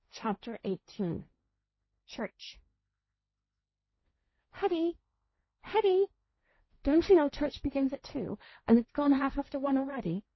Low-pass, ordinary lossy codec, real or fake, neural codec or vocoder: 7.2 kHz; MP3, 24 kbps; fake; codec, 16 kHz, 1.1 kbps, Voila-Tokenizer